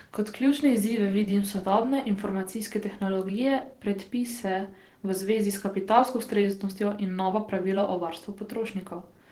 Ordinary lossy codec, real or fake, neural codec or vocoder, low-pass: Opus, 16 kbps; fake; vocoder, 44.1 kHz, 128 mel bands every 512 samples, BigVGAN v2; 19.8 kHz